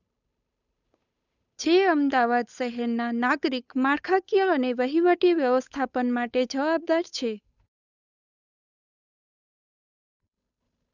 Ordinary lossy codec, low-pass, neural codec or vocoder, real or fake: none; 7.2 kHz; codec, 16 kHz, 8 kbps, FunCodec, trained on Chinese and English, 25 frames a second; fake